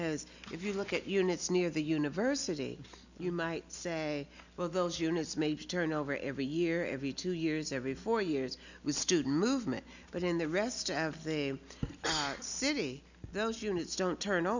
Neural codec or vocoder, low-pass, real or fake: none; 7.2 kHz; real